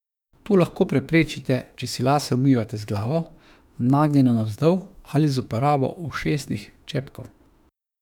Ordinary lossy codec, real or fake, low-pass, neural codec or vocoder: none; fake; 19.8 kHz; autoencoder, 48 kHz, 32 numbers a frame, DAC-VAE, trained on Japanese speech